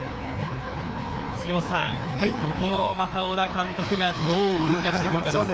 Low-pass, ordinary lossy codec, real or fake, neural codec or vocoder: none; none; fake; codec, 16 kHz, 2 kbps, FreqCodec, larger model